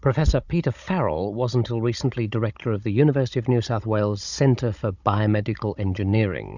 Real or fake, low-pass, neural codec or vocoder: fake; 7.2 kHz; codec, 16 kHz, 16 kbps, FunCodec, trained on Chinese and English, 50 frames a second